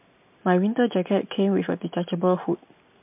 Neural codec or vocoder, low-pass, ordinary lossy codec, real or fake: none; 3.6 kHz; MP3, 32 kbps; real